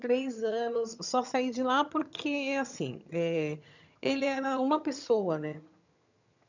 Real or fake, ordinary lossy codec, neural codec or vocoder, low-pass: fake; none; vocoder, 22.05 kHz, 80 mel bands, HiFi-GAN; 7.2 kHz